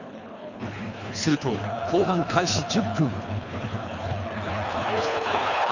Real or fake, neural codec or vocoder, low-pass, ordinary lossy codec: fake; codec, 24 kHz, 3 kbps, HILCodec; 7.2 kHz; none